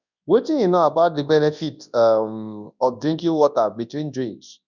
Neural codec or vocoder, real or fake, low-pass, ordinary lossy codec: codec, 24 kHz, 0.9 kbps, WavTokenizer, large speech release; fake; 7.2 kHz; none